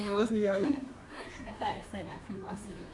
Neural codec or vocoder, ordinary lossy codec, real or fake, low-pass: codec, 24 kHz, 1 kbps, SNAC; none; fake; 10.8 kHz